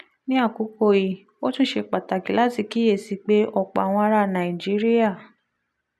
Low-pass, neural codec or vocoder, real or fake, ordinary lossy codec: none; none; real; none